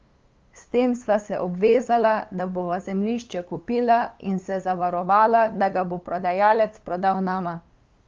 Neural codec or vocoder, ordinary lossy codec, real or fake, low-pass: codec, 16 kHz, 2 kbps, FunCodec, trained on LibriTTS, 25 frames a second; Opus, 32 kbps; fake; 7.2 kHz